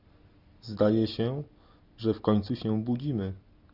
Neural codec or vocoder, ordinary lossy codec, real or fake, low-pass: none; Opus, 64 kbps; real; 5.4 kHz